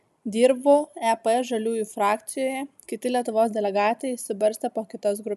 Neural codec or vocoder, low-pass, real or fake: none; 14.4 kHz; real